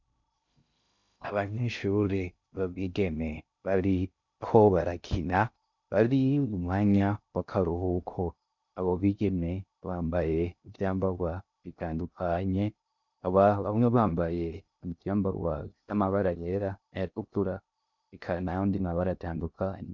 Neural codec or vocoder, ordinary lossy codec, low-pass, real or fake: codec, 16 kHz in and 24 kHz out, 0.6 kbps, FocalCodec, streaming, 2048 codes; MP3, 64 kbps; 7.2 kHz; fake